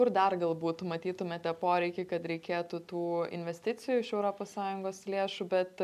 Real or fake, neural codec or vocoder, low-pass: real; none; 14.4 kHz